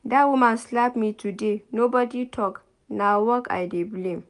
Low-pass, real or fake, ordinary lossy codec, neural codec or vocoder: 10.8 kHz; real; AAC, 96 kbps; none